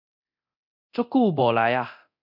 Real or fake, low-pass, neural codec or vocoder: fake; 5.4 kHz; codec, 24 kHz, 0.9 kbps, DualCodec